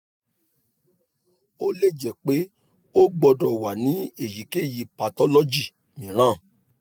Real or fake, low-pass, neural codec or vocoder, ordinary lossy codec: real; none; none; none